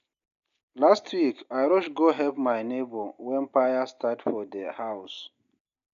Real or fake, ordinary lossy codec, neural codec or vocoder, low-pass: real; none; none; 7.2 kHz